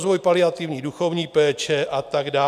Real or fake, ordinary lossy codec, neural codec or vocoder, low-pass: real; MP3, 96 kbps; none; 14.4 kHz